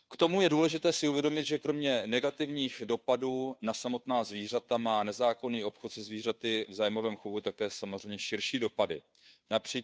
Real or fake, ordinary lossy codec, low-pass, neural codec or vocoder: fake; none; none; codec, 16 kHz, 2 kbps, FunCodec, trained on Chinese and English, 25 frames a second